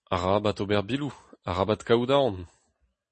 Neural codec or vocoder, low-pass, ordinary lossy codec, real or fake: none; 10.8 kHz; MP3, 32 kbps; real